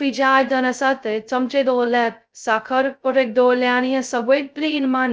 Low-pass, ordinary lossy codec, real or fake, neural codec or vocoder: none; none; fake; codec, 16 kHz, 0.2 kbps, FocalCodec